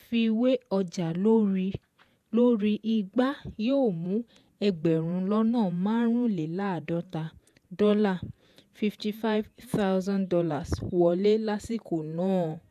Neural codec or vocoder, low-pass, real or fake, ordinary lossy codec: vocoder, 48 kHz, 128 mel bands, Vocos; 14.4 kHz; fake; AAC, 96 kbps